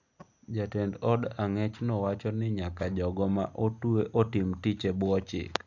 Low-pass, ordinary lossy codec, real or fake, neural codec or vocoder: 7.2 kHz; none; real; none